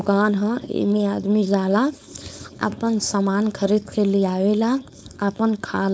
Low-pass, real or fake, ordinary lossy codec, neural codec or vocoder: none; fake; none; codec, 16 kHz, 4.8 kbps, FACodec